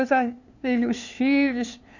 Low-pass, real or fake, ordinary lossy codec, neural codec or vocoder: 7.2 kHz; fake; none; codec, 16 kHz, 2 kbps, FunCodec, trained on LibriTTS, 25 frames a second